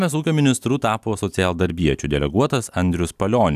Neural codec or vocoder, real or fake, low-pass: none; real; 14.4 kHz